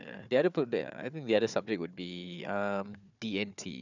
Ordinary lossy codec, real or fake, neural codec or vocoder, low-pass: none; fake; codec, 16 kHz, 4 kbps, FunCodec, trained on Chinese and English, 50 frames a second; 7.2 kHz